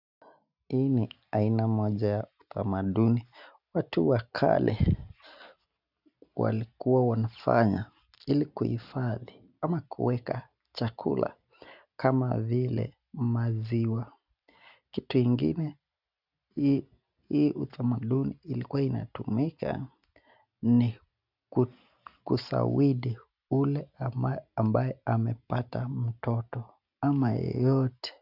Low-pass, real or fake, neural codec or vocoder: 5.4 kHz; real; none